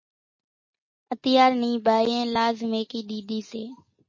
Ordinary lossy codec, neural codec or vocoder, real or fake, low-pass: MP3, 32 kbps; none; real; 7.2 kHz